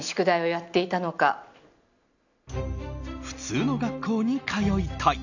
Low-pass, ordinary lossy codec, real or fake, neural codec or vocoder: 7.2 kHz; none; real; none